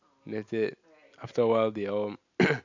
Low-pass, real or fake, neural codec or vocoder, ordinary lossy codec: 7.2 kHz; real; none; AAC, 48 kbps